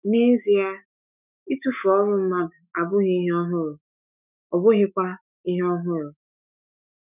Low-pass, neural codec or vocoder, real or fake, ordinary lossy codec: 3.6 kHz; autoencoder, 48 kHz, 128 numbers a frame, DAC-VAE, trained on Japanese speech; fake; none